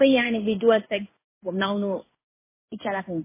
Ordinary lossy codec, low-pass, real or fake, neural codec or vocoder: MP3, 16 kbps; 3.6 kHz; fake; codec, 16 kHz in and 24 kHz out, 1 kbps, XY-Tokenizer